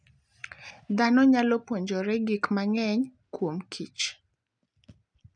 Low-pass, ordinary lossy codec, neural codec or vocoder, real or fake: 9.9 kHz; none; none; real